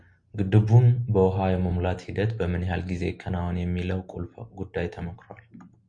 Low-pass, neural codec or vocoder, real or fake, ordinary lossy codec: 9.9 kHz; none; real; Opus, 64 kbps